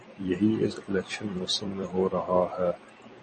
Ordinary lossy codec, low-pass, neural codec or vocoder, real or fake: MP3, 32 kbps; 10.8 kHz; vocoder, 44.1 kHz, 128 mel bands every 256 samples, BigVGAN v2; fake